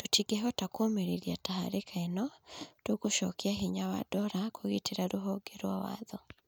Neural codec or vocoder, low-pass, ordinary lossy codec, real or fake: none; none; none; real